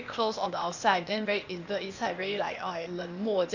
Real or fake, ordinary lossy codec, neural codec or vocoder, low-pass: fake; none; codec, 16 kHz, 0.8 kbps, ZipCodec; 7.2 kHz